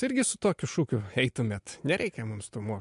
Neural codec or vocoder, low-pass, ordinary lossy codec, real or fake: none; 10.8 kHz; MP3, 64 kbps; real